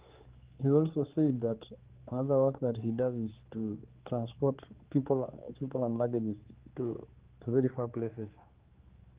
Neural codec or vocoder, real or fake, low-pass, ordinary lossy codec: codec, 16 kHz, 4 kbps, X-Codec, HuBERT features, trained on general audio; fake; 3.6 kHz; Opus, 16 kbps